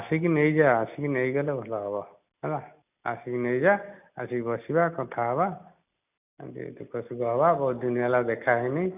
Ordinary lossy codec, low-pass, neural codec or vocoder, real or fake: none; 3.6 kHz; none; real